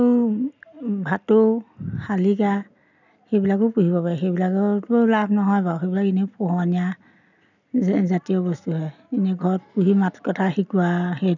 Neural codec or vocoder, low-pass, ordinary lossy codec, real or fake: none; 7.2 kHz; none; real